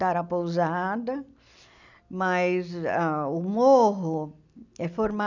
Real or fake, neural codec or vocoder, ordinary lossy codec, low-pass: real; none; none; 7.2 kHz